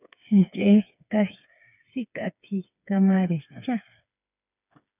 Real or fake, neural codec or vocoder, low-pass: fake; codec, 16 kHz, 4 kbps, FreqCodec, smaller model; 3.6 kHz